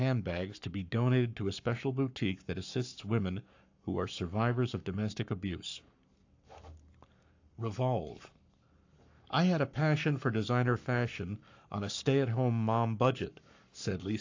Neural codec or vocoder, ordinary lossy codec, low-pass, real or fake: codec, 44.1 kHz, 7.8 kbps, Pupu-Codec; AAC, 48 kbps; 7.2 kHz; fake